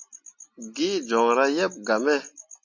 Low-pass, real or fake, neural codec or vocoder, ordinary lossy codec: 7.2 kHz; real; none; MP3, 48 kbps